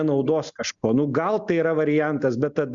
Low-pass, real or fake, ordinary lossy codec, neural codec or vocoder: 7.2 kHz; real; Opus, 64 kbps; none